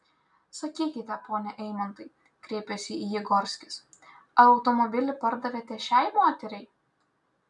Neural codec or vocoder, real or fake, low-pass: none; real; 10.8 kHz